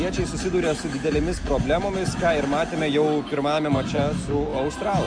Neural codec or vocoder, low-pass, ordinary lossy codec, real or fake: none; 9.9 kHz; Opus, 64 kbps; real